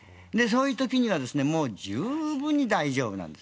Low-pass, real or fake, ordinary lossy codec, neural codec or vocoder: none; real; none; none